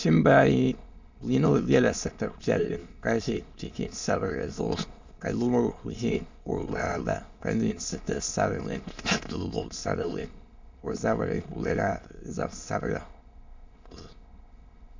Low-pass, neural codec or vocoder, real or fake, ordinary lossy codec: 7.2 kHz; autoencoder, 22.05 kHz, a latent of 192 numbers a frame, VITS, trained on many speakers; fake; MP3, 64 kbps